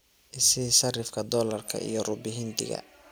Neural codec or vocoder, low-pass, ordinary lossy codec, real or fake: none; none; none; real